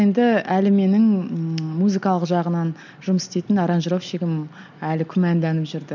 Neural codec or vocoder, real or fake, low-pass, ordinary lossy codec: none; real; 7.2 kHz; none